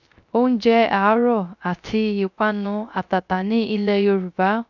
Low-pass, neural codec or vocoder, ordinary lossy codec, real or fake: 7.2 kHz; codec, 16 kHz, 0.3 kbps, FocalCodec; none; fake